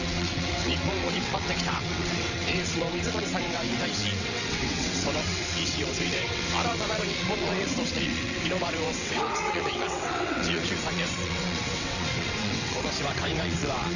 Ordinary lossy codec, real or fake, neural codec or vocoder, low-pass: none; fake; vocoder, 22.05 kHz, 80 mel bands, WaveNeXt; 7.2 kHz